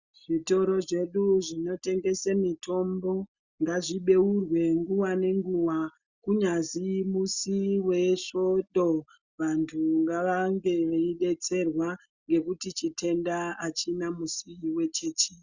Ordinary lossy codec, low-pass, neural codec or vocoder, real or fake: Opus, 64 kbps; 7.2 kHz; none; real